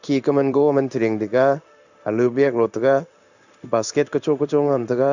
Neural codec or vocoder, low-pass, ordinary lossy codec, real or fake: codec, 16 kHz in and 24 kHz out, 1 kbps, XY-Tokenizer; 7.2 kHz; none; fake